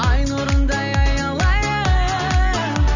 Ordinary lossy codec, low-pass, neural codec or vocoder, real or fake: none; 7.2 kHz; none; real